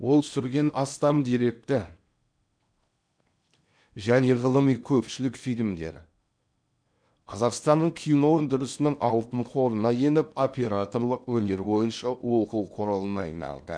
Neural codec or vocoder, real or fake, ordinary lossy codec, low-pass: codec, 16 kHz in and 24 kHz out, 0.8 kbps, FocalCodec, streaming, 65536 codes; fake; none; 9.9 kHz